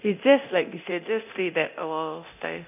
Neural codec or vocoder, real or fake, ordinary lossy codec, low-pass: codec, 24 kHz, 0.9 kbps, DualCodec; fake; none; 3.6 kHz